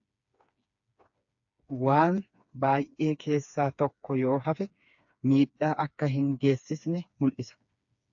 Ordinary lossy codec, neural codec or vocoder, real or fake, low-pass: AAC, 64 kbps; codec, 16 kHz, 4 kbps, FreqCodec, smaller model; fake; 7.2 kHz